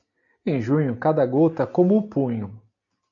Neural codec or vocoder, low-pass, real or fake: none; 7.2 kHz; real